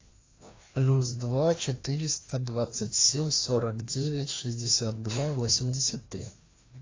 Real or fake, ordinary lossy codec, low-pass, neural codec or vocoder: fake; AAC, 32 kbps; 7.2 kHz; codec, 16 kHz, 1 kbps, FreqCodec, larger model